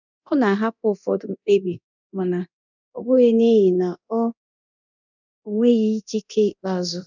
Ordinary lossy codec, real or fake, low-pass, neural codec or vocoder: none; fake; 7.2 kHz; codec, 24 kHz, 0.9 kbps, DualCodec